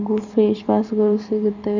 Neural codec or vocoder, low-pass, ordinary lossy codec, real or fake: vocoder, 44.1 kHz, 128 mel bands every 512 samples, BigVGAN v2; 7.2 kHz; none; fake